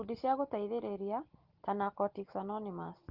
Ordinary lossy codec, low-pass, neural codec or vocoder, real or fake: Opus, 16 kbps; 5.4 kHz; none; real